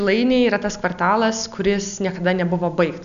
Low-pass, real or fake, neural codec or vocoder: 7.2 kHz; real; none